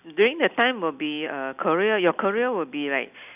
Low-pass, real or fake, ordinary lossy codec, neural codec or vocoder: 3.6 kHz; real; none; none